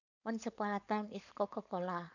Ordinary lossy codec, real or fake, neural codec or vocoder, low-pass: none; fake; codec, 16 kHz, 4.8 kbps, FACodec; 7.2 kHz